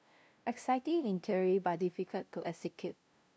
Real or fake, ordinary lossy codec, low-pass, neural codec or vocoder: fake; none; none; codec, 16 kHz, 0.5 kbps, FunCodec, trained on LibriTTS, 25 frames a second